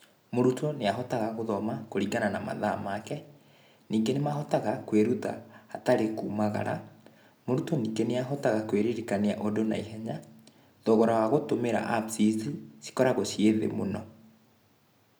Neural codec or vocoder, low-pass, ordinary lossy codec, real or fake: none; none; none; real